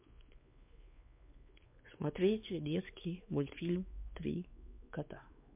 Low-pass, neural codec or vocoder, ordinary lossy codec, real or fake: 3.6 kHz; codec, 16 kHz, 4 kbps, X-Codec, WavLM features, trained on Multilingual LibriSpeech; MP3, 32 kbps; fake